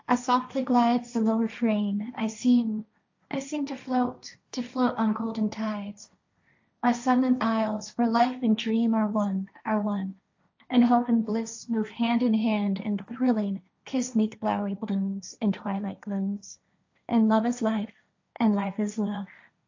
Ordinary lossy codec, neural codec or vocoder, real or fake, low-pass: AAC, 48 kbps; codec, 16 kHz, 1.1 kbps, Voila-Tokenizer; fake; 7.2 kHz